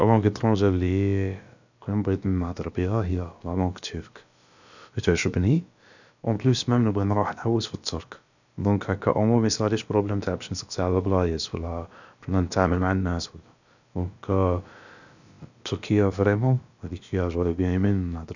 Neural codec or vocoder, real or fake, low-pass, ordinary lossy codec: codec, 16 kHz, about 1 kbps, DyCAST, with the encoder's durations; fake; 7.2 kHz; none